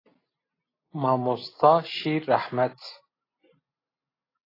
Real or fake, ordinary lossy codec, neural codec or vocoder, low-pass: real; AAC, 24 kbps; none; 5.4 kHz